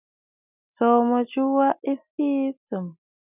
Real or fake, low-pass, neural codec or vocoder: real; 3.6 kHz; none